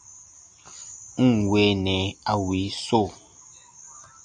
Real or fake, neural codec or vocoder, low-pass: real; none; 10.8 kHz